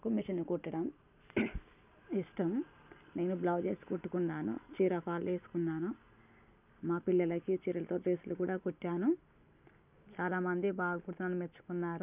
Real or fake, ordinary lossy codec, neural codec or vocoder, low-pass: real; none; none; 3.6 kHz